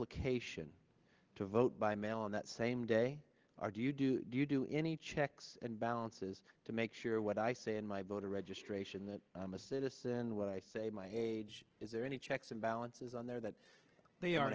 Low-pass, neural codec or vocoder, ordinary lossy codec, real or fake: 7.2 kHz; none; Opus, 16 kbps; real